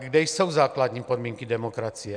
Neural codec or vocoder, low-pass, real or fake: none; 9.9 kHz; real